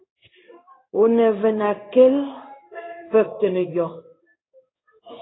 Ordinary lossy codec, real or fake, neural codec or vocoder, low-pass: AAC, 16 kbps; fake; codec, 16 kHz in and 24 kHz out, 1 kbps, XY-Tokenizer; 7.2 kHz